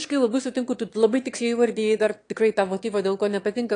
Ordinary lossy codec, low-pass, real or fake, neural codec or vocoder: AAC, 48 kbps; 9.9 kHz; fake; autoencoder, 22.05 kHz, a latent of 192 numbers a frame, VITS, trained on one speaker